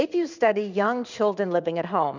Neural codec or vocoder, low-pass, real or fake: codec, 16 kHz in and 24 kHz out, 1 kbps, XY-Tokenizer; 7.2 kHz; fake